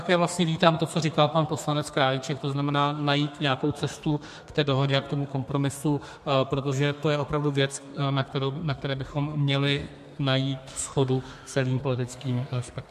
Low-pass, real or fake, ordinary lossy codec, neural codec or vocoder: 14.4 kHz; fake; MP3, 64 kbps; codec, 32 kHz, 1.9 kbps, SNAC